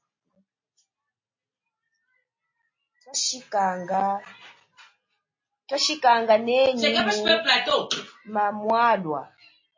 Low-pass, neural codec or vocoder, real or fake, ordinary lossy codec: 7.2 kHz; none; real; MP3, 32 kbps